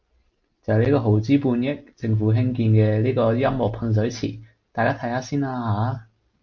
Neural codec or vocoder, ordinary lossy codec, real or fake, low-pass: none; MP3, 48 kbps; real; 7.2 kHz